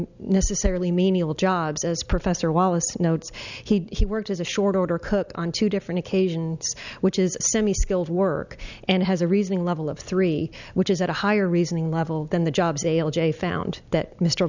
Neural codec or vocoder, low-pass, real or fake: none; 7.2 kHz; real